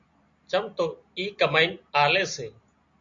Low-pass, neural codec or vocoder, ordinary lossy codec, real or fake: 7.2 kHz; none; MP3, 48 kbps; real